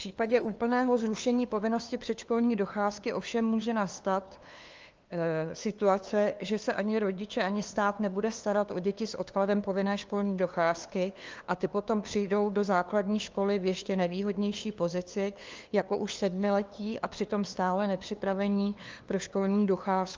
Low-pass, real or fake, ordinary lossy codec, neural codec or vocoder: 7.2 kHz; fake; Opus, 24 kbps; codec, 16 kHz, 2 kbps, FunCodec, trained on LibriTTS, 25 frames a second